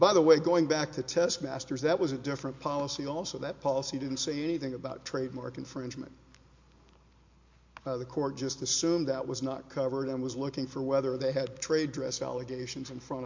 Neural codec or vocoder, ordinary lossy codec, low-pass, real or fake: none; MP3, 48 kbps; 7.2 kHz; real